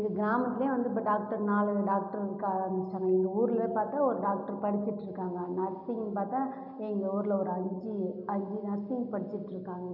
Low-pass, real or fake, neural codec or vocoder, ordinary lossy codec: 5.4 kHz; real; none; none